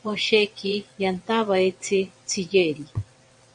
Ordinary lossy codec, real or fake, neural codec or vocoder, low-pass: MP3, 48 kbps; fake; vocoder, 22.05 kHz, 80 mel bands, WaveNeXt; 9.9 kHz